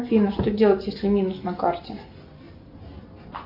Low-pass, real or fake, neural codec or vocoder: 5.4 kHz; real; none